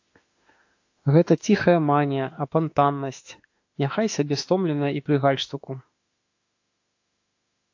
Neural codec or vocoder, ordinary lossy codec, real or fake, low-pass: autoencoder, 48 kHz, 32 numbers a frame, DAC-VAE, trained on Japanese speech; AAC, 48 kbps; fake; 7.2 kHz